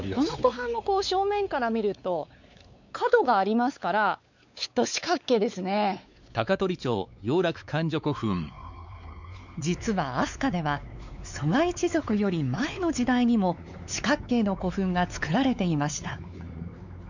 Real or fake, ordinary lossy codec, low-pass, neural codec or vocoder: fake; none; 7.2 kHz; codec, 16 kHz, 4 kbps, X-Codec, WavLM features, trained on Multilingual LibriSpeech